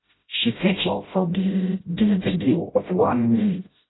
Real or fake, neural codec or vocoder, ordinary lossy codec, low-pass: fake; codec, 16 kHz, 0.5 kbps, FreqCodec, smaller model; AAC, 16 kbps; 7.2 kHz